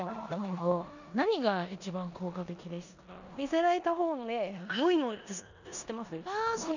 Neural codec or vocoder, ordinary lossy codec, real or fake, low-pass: codec, 16 kHz in and 24 kHz out, 0.9 kbps, LongCat-Audio-Codec, four codebook decoder; none; fake; 7.2 kHz